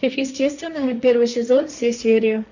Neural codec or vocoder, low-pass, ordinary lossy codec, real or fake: codec, 16 kHz, 1.1 kbps, Voila-Tokenizer; 7.2 kHz; none; fake